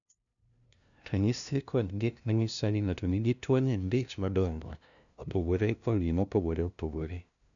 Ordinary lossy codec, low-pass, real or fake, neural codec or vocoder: none; 7.2 kHz; fake; codec, 16 kHz, 0.5 kbps, FunCodec, trained on LibriTTS, 25 frames a second